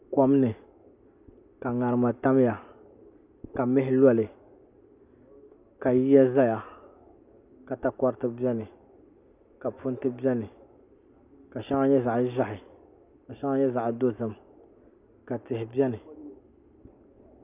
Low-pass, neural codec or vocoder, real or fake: 3.6 kHz; none; real